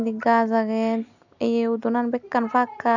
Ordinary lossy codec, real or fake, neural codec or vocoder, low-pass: none; real; none; 7.2 kHz